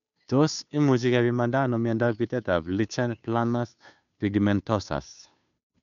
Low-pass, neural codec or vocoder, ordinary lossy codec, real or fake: 7.2 kHz; codec, 16 kHz, 2 kbps, FunCodec, trained on Chinese and English, 25 frames a second; none; fake